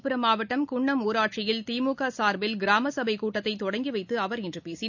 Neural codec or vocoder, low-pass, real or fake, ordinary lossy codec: none; 7.2 kHz; real; none